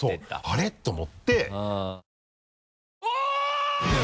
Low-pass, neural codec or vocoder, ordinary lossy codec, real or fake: none; none; none; real